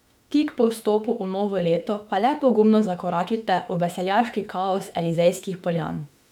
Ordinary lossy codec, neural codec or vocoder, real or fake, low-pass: none; autoencoder, 48 kHz, 32 numbers a frame, DAC-VAE, trained on Japanese speech; fake; 19.8 kHz